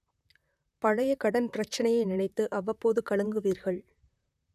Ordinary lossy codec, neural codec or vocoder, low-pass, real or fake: none; vocoder, 44.1 kHz, 128 mel bands, Pupu-Vocoder; 14.4 kHz; fake